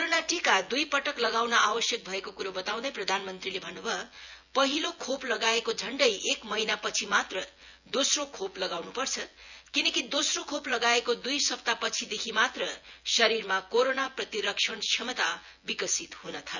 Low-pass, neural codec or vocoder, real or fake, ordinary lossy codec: 7.2 kHz; vocoder, 24 kHz, 100 mel bands, Vocos; fake; none